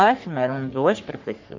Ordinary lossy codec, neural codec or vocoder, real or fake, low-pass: MP3, 64 kbps; codec, 44.1 kHz, 3.4 kbps, Pupu-Codec; fake; 7.2 kHz